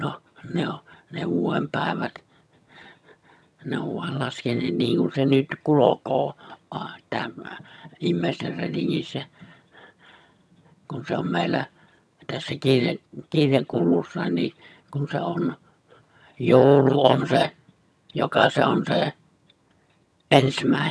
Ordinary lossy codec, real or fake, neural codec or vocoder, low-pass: none; fake; vocoder, 22.05 kHz, 80 mel bands, HiFi-GAN; none